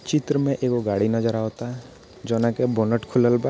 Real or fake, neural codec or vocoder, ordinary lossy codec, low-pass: real; none; none; none